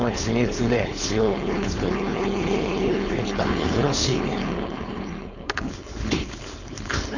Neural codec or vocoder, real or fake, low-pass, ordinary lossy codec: codec, 16 kHz, 4.8 kbps, FACodec; fake; 7.2 kHz; none